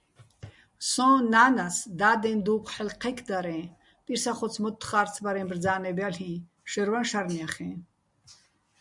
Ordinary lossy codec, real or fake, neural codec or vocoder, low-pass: MP3, 96 kbps; real; none; 10.8 kHz